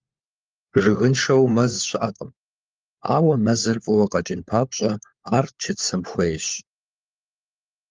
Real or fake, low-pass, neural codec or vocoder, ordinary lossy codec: fake; 7.2 kHz; codec, 16 kHz, 4 kbps, FunCodec, trained on LibriTTS, 50 frames a second; Opus, 32 kbps